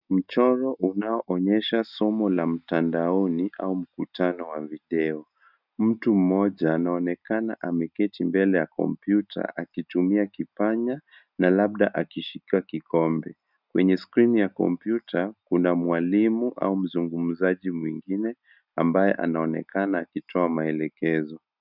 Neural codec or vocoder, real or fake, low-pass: none; real; 5.4 kHz